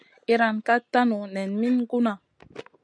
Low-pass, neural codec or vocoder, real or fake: 9.9 kHz; none; real